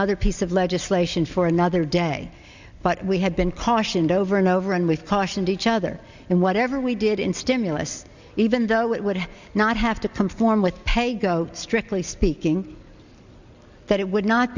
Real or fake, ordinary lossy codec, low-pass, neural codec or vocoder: real; Opus, 64 kbps; 7.2 kHz; none